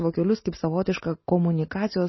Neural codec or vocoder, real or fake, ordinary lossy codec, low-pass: none; real; MP3, 24 kbps; 7.2 kHz